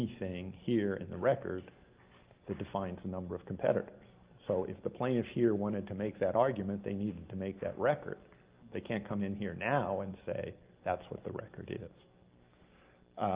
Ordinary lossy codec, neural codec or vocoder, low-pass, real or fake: Opus, 32 kbps; none; 3.6 kHz; real